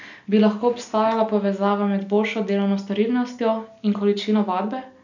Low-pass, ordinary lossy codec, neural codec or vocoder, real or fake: 7.2 kHz; none; none; real